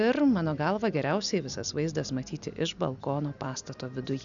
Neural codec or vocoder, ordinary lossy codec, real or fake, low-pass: none; MP3, 96 kbps; real; 7.2 kHz